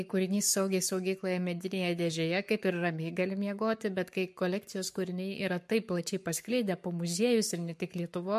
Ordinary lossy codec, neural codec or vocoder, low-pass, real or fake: MP3, 64 kbps; codec, 44.1 kHz, 7.8 kbps, Pupu-Codec; 14.4 kHz; fake